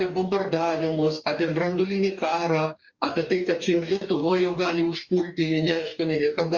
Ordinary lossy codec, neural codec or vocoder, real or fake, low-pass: Opus, 64 kbps; codec, 44.1 kHz, 2.6 kbps, DAC; fake; 7.2 kHz